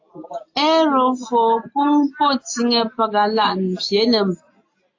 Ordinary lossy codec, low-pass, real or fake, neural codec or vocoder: AAC, 48 kbps; 7.2 kHz; real; none